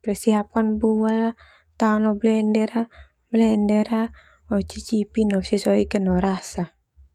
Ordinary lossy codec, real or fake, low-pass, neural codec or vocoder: none; fake; 19.8 kHz; codec, 44.1 kHz, 7.8 kbps, DAC